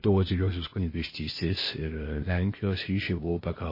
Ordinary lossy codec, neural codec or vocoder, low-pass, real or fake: MP3, 24 kbps; codec, 16 kHz, 0.8 kbps, ZipCodec; 5.4 kHz; fake